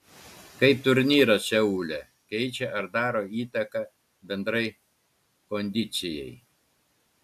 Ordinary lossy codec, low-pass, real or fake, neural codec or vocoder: AAC, 96 kbps; 14.4 kHz; real; none